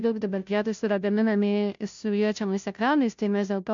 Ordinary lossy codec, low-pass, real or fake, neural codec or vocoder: MP3, 64 kbps; 7.2 kHz; fake; codec, 16 kHz, 0.5 kbps, FunCodec, trained on Chinese and English, 25 frames a second